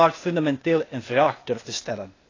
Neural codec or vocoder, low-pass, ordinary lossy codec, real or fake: codec, 16 kHz, 0.8 kbps, ZipCodec; 7.2 kHz; AAC, 32 kbps; fake